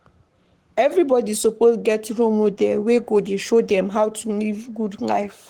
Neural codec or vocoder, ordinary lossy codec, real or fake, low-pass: codec, 44.1 kHz, 7.8 kbps, Pupu-Codec; Opus, 16 kbps; fake; 19.8 kHz